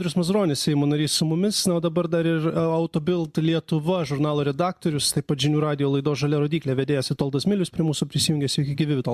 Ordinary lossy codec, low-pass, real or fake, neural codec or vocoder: MP3, 96 kbps; 14.4 kHz; real; none